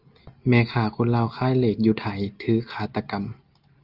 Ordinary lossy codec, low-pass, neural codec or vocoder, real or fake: Opus, 24 kbps; 5.4 kHz; none; real